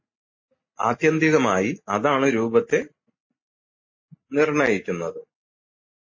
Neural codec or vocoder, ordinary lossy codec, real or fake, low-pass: none; MP3, 32 kbps; real; 7.2 kHz